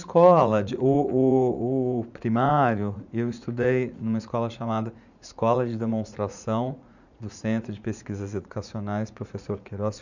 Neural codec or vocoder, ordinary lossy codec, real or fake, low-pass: vocoder, 44.1 kHz, 80 mel bands, Vocos; none; fake; 7.2 kHz